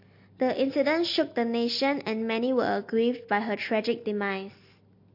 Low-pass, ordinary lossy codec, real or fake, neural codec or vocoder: 5.4 kHz; MP3, 32 kbps; real; none